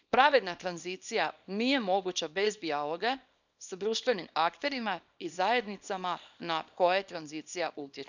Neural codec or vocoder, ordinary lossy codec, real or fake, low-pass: codec, 24 kHz, 0.9 kbps, WavTokenizer, small release; none; fake; 7.2 kHz